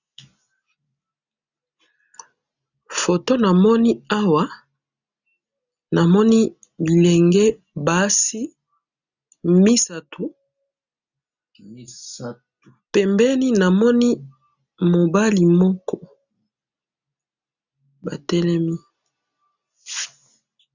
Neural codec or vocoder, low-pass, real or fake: none; 7.2 kHz; real